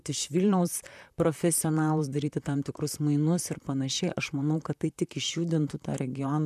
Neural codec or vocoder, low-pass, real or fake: vocoder, 44.1 kHz, 128 mel bands, Pupu-Vocoder; 14.4 kHz; fake